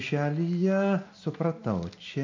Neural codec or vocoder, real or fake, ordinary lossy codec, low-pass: none; real; MP3, 64 kbps; 7.2 kHz